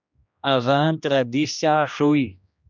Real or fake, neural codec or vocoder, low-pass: fake; codec, 16 kHz, 1 kbps, X-Codec, HuBERT features, trained on general audio; 7.2 kHz